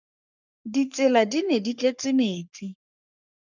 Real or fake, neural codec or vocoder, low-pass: fake; codec, 44.1 kHz, 3.4 kbps, Pupu-Codec; 7.2 kHz